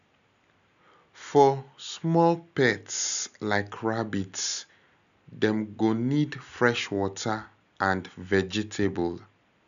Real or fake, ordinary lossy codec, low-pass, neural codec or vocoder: real; none; 7.2 kHz; none